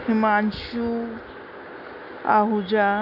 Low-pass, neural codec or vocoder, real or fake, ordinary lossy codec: 5.4 kHz; none; real; none